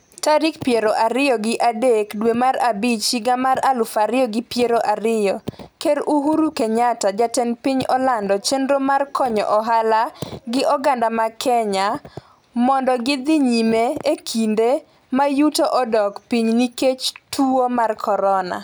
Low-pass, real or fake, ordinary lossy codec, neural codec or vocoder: none; real; none; none